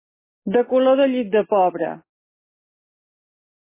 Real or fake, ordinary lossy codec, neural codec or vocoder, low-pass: real; MP3, 16 kbps; none; 3.6 kHz